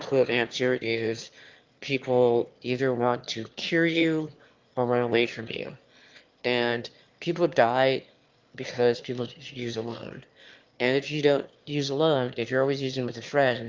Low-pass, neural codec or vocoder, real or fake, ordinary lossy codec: 7.2 kHz; autoencoder, 22.05 kHz, a latent of 192 numbers a frame, VITS, trained on one speaker; fake; Opus, 32 kbps